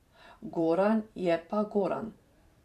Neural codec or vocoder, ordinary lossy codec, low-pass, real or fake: vocoder, 44.1 kHz, 128 mel bands every 512 samples, BigVGAN v2; none; 14.4 kHz; fake